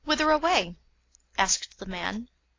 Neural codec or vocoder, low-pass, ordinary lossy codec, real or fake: none; 7.2 kHz; AAC, 32 kbps; real